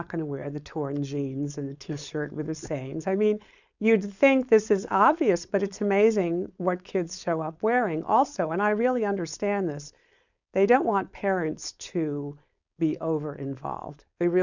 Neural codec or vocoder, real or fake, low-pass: codec, 16 kHz, 4.8 kbps, FACodec; fake; 7.2 kHz